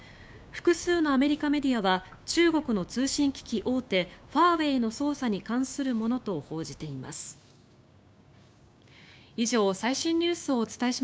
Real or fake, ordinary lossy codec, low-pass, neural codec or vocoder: fake; none; none; codec, 16 kHz, 6 kbps, DAC